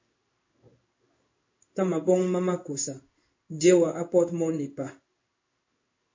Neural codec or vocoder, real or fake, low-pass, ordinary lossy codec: codec, 16 kHz in and 24 kHz out, 1 kbps, XY-Tokenizer; fake; 7.2 kHz; MP3, 32 kbps